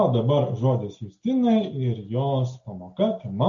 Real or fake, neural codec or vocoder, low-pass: real; none; 7.2 kHz